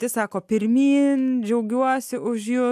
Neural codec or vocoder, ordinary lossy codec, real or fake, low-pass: none; AAC, 96 kbps; real; 14.4 kHz